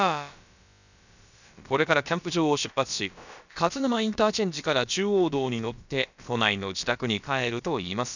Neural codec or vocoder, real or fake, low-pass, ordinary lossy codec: codec, 16 kHz, about 1 kbps, DyCAST, with the encoder's durations; fake; 7.2 kHz; none